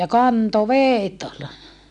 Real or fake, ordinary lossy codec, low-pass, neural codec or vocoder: real; none; 10.8 kHz; none